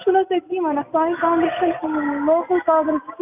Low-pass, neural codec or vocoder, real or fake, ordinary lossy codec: 3.6 kHz; none; real; AAC, 32 kbps